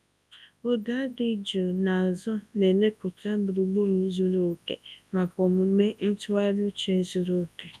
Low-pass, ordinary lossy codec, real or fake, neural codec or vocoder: none; none; fake; codec, 24 kHz, 0.9 kbps, WavTokenizer, large speech release